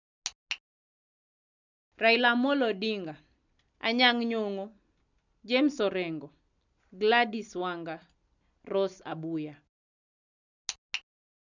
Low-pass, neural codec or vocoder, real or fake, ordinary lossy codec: 7.2 kHz; none; real; none